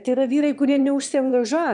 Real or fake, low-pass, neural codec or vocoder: fake; 9.9 kHz; autoencoder, 22.05 kHz, a latent of 192 numbers a frame, VITS, trained on one speaker